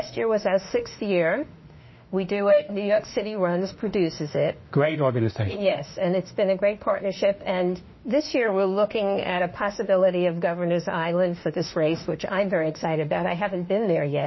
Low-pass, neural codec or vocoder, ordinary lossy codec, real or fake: 7.2 kHz; autoencoder, 48 kHz, 32 numbers a frame, DAC-VAE, trained on Japanese speech; MP3, 24 kbps; fake